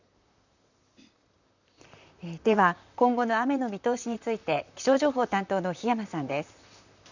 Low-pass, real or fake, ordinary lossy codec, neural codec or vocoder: 7.2 kHz; fake; none; vocoder, 44.1 kHz, 128 mel bands, Pupu-Vocoder